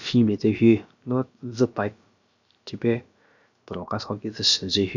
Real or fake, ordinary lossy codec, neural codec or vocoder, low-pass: fake; none; codec, 16 kHz, about 1 kbps, DyCAST, with the encoder's durations; 7.2 kHz